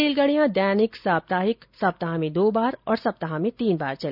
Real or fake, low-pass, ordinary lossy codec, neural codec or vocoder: real; 5.4 kHz; none; none